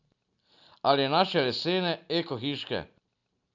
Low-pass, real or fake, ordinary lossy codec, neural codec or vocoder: 7.2 kHz; real; none; none